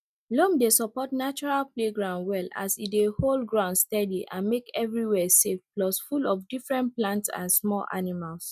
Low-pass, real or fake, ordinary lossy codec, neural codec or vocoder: 14.4 kHz; real; none; none